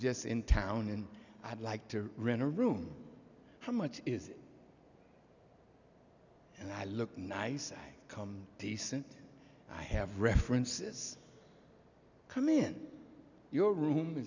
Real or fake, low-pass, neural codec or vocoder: real; 7.2 kHz; none